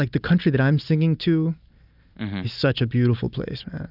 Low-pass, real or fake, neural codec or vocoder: 5.4 kHz; real; none